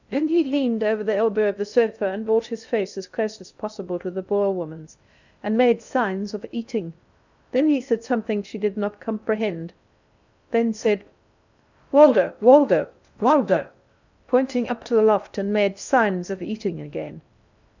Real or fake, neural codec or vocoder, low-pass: fake; codec, 16 kHz in and 24 kHz out, 0.6 kbps, FocalCodec, streaming, 2048 codes; 7.2 kHz